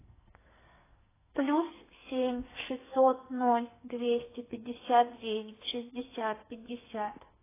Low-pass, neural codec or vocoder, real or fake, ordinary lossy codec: 3.6 kHz; codec, 44.1 kHz, 2.6 kbps, SNAC; fake; AAC, 16 kbps